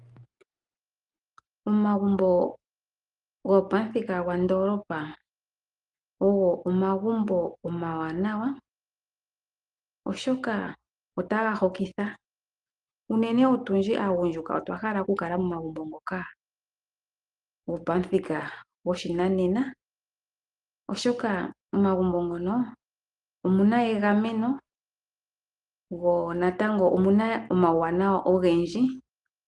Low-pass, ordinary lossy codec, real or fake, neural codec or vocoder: 10.8 kHz; Opus, 24 kbps; real; none